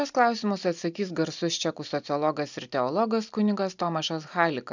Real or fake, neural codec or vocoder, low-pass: real; none; 7.2 kHz